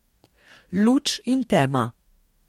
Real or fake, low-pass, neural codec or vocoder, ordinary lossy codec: fake; 19.8 kHz; codec, 44.1 kHz, 2.6 kbps, DAC; MP3, 64 kbps